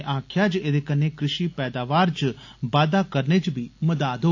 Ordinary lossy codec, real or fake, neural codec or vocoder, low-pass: AAC, 48 kbps; real; none; 7.2 kHz